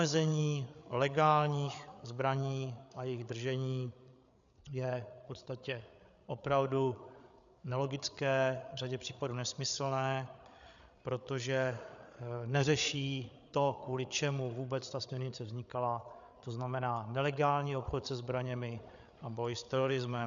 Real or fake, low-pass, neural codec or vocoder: fake; 7.2 kHz; codec, 16 kHz, 8 kbps, FreqCodec, larger model